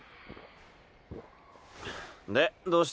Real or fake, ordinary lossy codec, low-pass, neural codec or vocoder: real; none; none; none